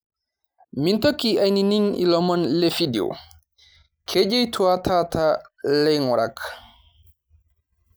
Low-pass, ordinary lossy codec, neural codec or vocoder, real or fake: none; none; none; real